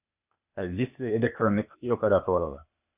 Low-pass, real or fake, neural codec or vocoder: 3.6 kHz; fake; codec, 16 kHz, 0.8 kbps, ZipCodec